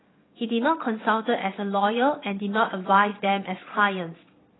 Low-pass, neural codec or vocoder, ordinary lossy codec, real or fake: 7.2 kHz; vocoder, 22.05 kHz, 80 mel bands, Vocos; AAC, 16 kbps; fake